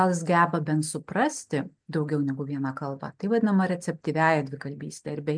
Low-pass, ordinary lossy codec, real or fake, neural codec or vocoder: 9.9 kHz; MP3, 96 kbps; real; none